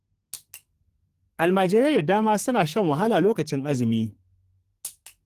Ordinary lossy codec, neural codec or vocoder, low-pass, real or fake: Opus, 24 kbps; codec, 44.1 kHz, 2.6 kbps, SNAC; 14.4 kHz; fake